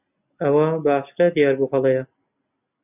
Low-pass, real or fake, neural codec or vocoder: 3.6 kHz; real; none